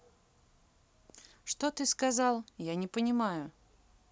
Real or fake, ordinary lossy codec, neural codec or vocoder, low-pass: real; none; none; none